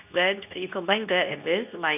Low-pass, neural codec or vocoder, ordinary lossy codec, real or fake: 3.6 kHz; codec, 24 kHz, 0.9 kbps, WavTokenizer, medium speech release version 2; none; fake